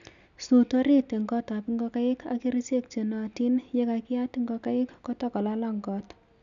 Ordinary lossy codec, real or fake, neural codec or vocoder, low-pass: none; real; none; 7.2 kHz